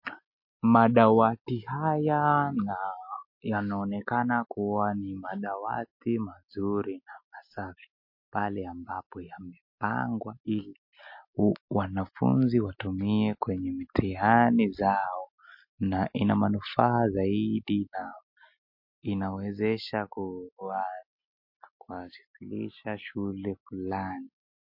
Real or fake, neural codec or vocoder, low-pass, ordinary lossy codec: real; none; 5.4 kHz; MP3, 32 kbps